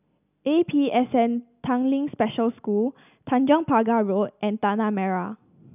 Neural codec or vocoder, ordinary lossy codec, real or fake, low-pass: none; none; real; 3.6 kHz